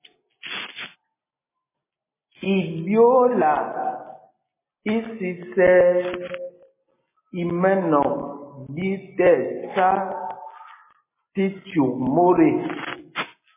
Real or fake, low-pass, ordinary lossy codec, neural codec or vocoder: real; 3.6 kHz; MP3, 16 kbps; none